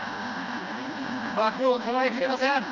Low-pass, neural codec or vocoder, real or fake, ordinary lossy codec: 7.2 kHz; codec, 16 kHz, 1 kbps, FreqCodec, smaller model; fake; none